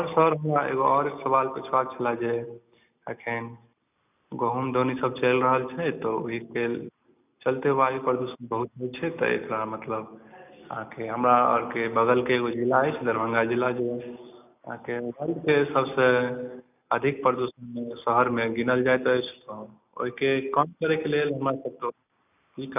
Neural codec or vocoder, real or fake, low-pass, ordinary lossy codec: none; real; 3.6 kHz; none